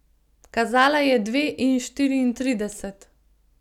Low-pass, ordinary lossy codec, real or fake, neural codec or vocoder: 19.8 kHz; none; real; none